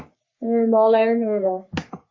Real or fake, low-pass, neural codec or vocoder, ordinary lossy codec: fake; 7.2 kHz; codec, 44.1 kHz, 3.4 kbps, Pupu-Codec; MP3, 48 kbps